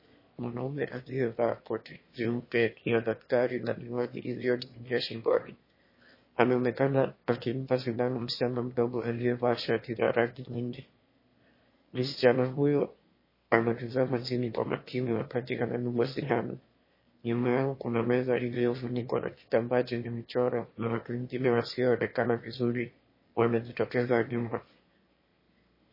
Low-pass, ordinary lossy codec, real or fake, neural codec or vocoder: 5.4 kHz; MP3, 24 kbps; fake; autoencoder, 22.05 kHz, a latent of 192 numbers a frame, VITS, trained on one speaker